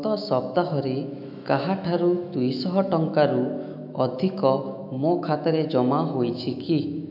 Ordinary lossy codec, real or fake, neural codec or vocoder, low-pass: none; fake; autoencoder, 48 kHz, 128 numbers a frame, DAC-VAE, trained on Japanese speech; 5.4 kHz